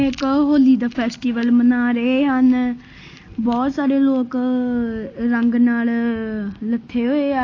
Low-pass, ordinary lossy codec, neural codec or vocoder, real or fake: 7.2 kHz; AAC, 32 kbps; none; real